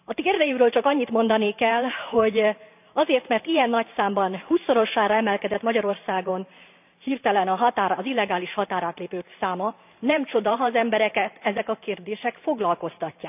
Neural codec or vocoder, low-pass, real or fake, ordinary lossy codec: vocoder, 44.1 kHz, 128 mel bands every 512 samples, BigVGAN v2; 3.6 kHz; fake; none